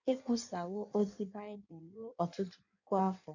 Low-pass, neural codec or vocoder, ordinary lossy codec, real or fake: 7.2 kHz; codec, 16 kHz in and 24 kHz out, 1.1 kbps, FireRedTTS-2 codec; none; fake